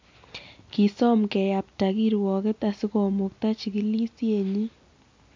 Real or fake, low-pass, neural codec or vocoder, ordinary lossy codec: real; 7.2 kHz; none; MP3, 48 kbps